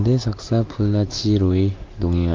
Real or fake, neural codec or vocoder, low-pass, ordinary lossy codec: real; none; 7.2 kHz; Opus, 24 kbps